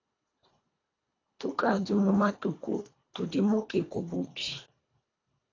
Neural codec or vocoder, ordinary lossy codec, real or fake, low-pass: codec, 24 kHz, 1.5 kbps, HILCodec; AAC, 32 kbps; fake; 7.2 kHz